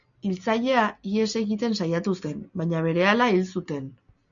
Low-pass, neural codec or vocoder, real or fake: 7.2 kHz; none; real